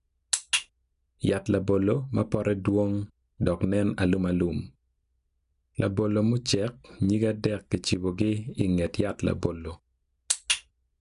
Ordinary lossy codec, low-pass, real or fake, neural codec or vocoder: none; 10.8 kHz; real; none